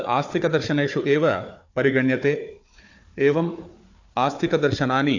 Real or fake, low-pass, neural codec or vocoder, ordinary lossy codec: fake; 7.2 kHz; codec, 16 kHz, 4 kbps, FunCodec, trained on Chinese and English, 50 frames a second; AAC, 48 kbps